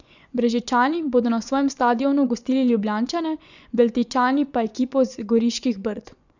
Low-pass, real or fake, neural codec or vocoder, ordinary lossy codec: 7.2 kHz; fake; vocoder, 24 kHz, 100 mel bands, Vocos; none